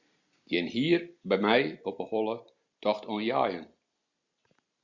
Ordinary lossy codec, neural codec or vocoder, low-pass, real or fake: Opus, 64 kbps; none; 7.2 kHz; real